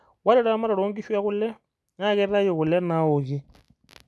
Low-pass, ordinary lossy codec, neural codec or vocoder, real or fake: none; none; none; real